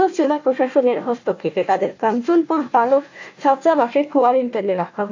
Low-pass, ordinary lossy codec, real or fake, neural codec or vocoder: 7.2 kHz; AAC, 48 kbps; fake; codec, 16 kHz, 1 kbps, FunCodec, trained on Chinese and English, 50 frames a second